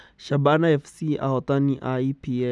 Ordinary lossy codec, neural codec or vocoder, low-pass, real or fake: none; none; none; real